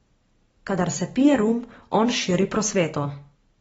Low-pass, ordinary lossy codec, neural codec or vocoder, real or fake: 19.8 kHz; AAC, 24 kbps; none; real